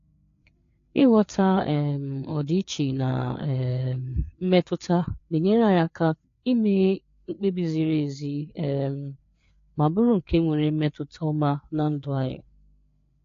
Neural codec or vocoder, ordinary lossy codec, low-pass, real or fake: codec, 16 kHz, 4 kbps, FreqCodec, larger model; AAC, 48 kbps; 7.2 kHz; fake